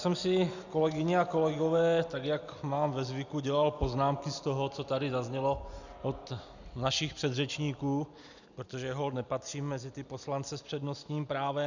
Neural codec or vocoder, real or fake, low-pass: none; real; 7.2 kHz